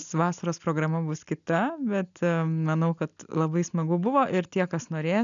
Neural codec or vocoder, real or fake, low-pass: none; real; 7.2 kHz